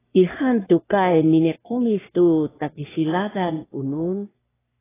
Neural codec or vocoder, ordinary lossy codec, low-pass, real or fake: codec, 44.1 kHz, 3.4 kbps, Pupu-Codec; AAC, 16 kbps; 3.6 kHz; fake